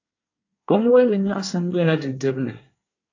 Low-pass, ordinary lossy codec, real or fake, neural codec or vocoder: 7.2 kHz; AAC, 48 kbps; fake; codec, 24 kHz, 1 kbps, SNAC